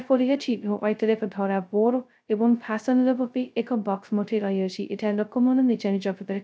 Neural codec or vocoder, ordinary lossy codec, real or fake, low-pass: codec, 16 kHz, 0.2 kbps, FocalCodec; none; fake; none